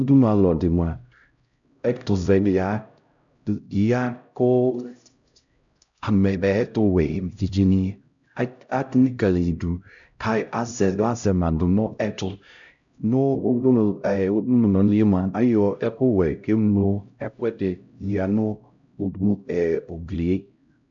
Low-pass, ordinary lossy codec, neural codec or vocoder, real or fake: 7.2 kHz; MP3, 64 kbps; codec, 16 kHz, 0.5 kbps, X-Codec, HuBERT features, trained on LibriSpeech; fake